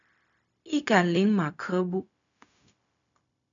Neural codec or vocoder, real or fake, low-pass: codec, 16 kHz, 0.4 kbps, LongCat-Audio-Codec; fake; 7.2 kHz